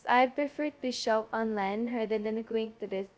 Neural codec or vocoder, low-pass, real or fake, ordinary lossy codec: codec, 16 kHz, 0.2 kbps, FocalCodec; none; fake; none